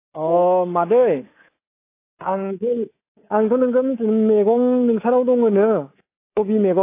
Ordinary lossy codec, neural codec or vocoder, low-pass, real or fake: AAC, 24 kbps; codec, 24 kHz, 3.1 kbps, DualCodec; 3.6 kHz; fake